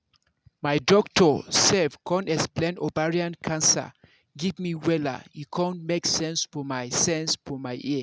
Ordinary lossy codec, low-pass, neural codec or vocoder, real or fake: none; none; none; real